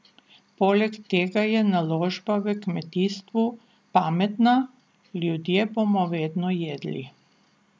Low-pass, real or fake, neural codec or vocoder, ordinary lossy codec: none; real; none; none